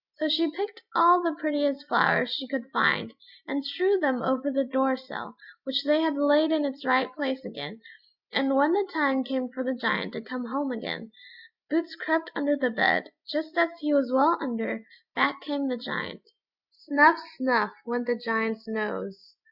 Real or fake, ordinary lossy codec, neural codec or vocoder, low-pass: real; Opus, 64 kbps; none; 5.4 kHz